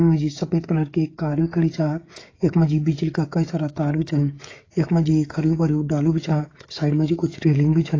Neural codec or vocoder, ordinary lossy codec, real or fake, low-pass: codec, 16 kHz, 16 kbps, FreqCodec, smaller model; AAC, 32 kbps; fake; 7.2 kHz